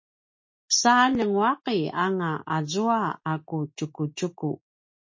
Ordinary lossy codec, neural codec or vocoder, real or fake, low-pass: MP3, 32 kbps; none; real; 7.2 kHz